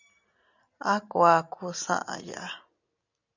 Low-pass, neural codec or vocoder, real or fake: 7.2 kHz; none; real